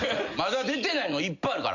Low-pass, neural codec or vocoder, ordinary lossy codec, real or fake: 7.2 kHz; none; none; real